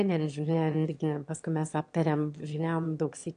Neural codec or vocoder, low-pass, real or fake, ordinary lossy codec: autoencoder, 22.05 kHz, a latent of 192 numbers a frame, VITS, trained on one speaker; 9.9 kHz; fake; AAC, 64 kbps